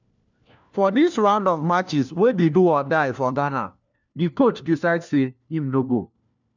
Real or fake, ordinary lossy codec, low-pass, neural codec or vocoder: fake; none; 7.2 kHz; codec, 16 kHz, 1 kbps, FunCodec, trained on LibriTTS, 50 frames a second